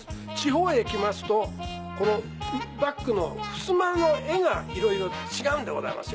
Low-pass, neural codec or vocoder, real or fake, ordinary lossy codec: none; none; real; none